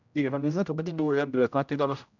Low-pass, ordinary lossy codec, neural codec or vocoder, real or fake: 7.2 kHz; none; codec, 16 kHz, 0.5 kbps, X-Codec, HuBERT features, trained on general audio; fake